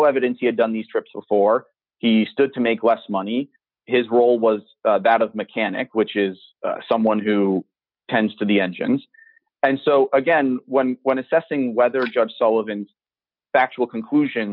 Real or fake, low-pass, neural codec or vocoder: real; 5.4 kHz; none